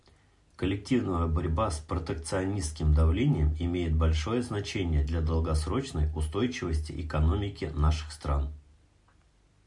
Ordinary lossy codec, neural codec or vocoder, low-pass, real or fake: MP3, 64 kbps; none; 10.8 kHz; real